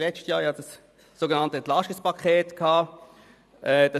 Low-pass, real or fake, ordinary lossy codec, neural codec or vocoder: 14.4 kHz; fake; none; vocoder, 44.1 kHz, 128 mel bands every 512 samples, BigVGAN v2